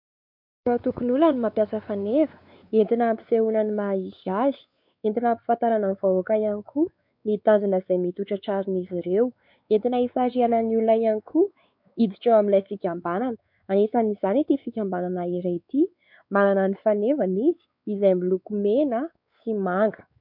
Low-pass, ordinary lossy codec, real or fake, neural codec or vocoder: 5.4 kHz; MP3, 48 kbps; fake; codec, 16 kHz, 4 kbps, X-Codec, WavLM features, trained on Multilingual LibriSpeech